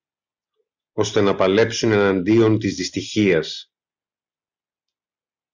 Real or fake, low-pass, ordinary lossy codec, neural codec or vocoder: real; 7.2 kHz; MP3, 64 kbps; none